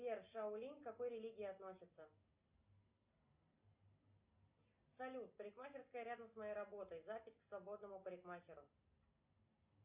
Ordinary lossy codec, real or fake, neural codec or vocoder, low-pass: Opus, 64 kbps; real; none; 3.6 kHz